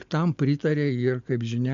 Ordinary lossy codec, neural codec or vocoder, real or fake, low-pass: MP3, 64 kbps; none; real; 7.2 kHz